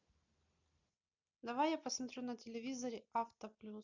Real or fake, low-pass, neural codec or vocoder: real; 7.2 kHz; none